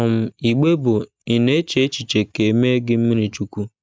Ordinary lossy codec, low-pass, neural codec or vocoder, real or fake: none; none; none; real